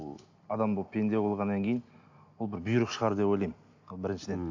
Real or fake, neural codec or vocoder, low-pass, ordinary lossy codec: real; none; 7.2 kHz; AAC, 48 kbps